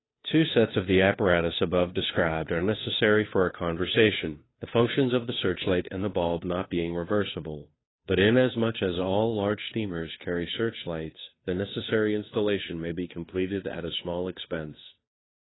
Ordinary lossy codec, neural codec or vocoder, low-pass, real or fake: AAC, 16 kbps; codec, 16 kHz, 2 kbps, FunCodec, trained on Chinese and English, 25 frames a second; 7.2 kHz; fake